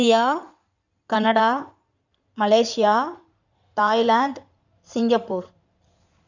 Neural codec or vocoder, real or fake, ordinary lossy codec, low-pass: codec, 16 kHz in and 24 kHz out, 2.2 kbps, FireRedTTS-2 codec; fake; none; 7.2 kHz